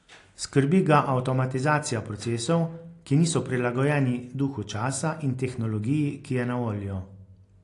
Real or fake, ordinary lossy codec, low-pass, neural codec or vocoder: real; AAC, 48 kbps; 10.8 kHz; none